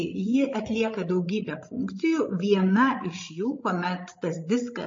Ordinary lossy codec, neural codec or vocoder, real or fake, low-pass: MP3, 32 kbps; codec, 16 kHz, 16 kbps, FreqCodec, larger model; fake; 7.2 kHz